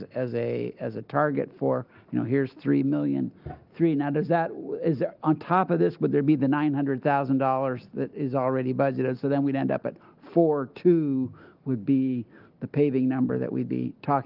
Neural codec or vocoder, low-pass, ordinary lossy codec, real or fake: none; 5.4 kHz; Opus, 32 kbps; real